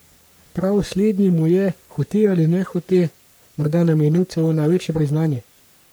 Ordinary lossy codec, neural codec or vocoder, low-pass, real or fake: none; codec, 44.1 kHz, 3.4 kbps, Pupu-Codec; none; fake